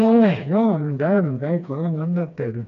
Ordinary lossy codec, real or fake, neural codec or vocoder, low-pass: AAC, 96 kbps; fake; codec, 16 kHz, 2 kbps, FreqCodec, smaller model; 7.2 kHz